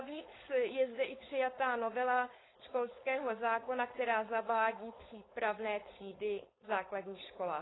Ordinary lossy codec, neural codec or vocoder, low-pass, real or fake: AAC, 16 kbps; codec, 16 kHz, 4.8 kbps, FACodec; 7.2 kHz; fake